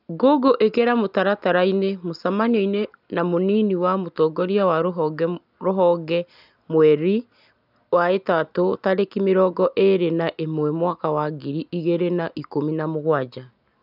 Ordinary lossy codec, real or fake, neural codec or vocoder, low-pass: none; real; none; 5.4 kHz